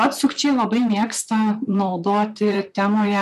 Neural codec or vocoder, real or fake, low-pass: vocoder, 44.1 kHz, 128 mel bands, Pupu-Vocoder; fake; 14.4 kHz